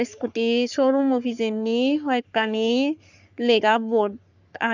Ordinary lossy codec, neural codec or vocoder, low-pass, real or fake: none; codec, 44.1 kHz, 3.4 kbps, Pupu-Codec; 7.2 kHz; fake